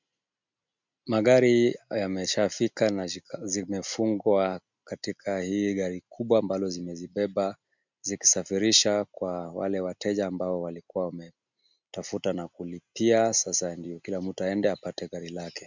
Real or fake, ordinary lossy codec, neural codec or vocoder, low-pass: real; MP3, 64 kbps; none; 7.2 kHz